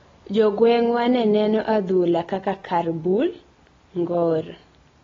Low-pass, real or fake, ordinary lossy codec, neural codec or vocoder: 7.2 kHz; real; AAC, 24 kbps; none